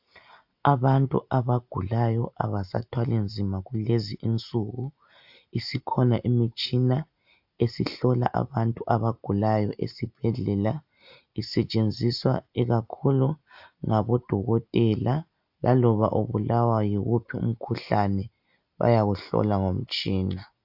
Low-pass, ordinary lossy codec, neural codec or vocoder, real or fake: 5.4 kHz; AAC, 48 kbps; none; real